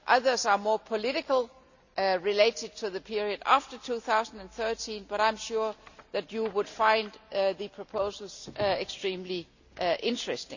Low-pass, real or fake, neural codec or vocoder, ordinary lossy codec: 7.2 kHz; real; none; none